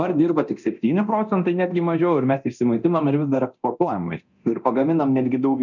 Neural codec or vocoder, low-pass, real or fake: codec, 24 kHz, 0.9 kbps, DualCodec; 7.2 kHz; fake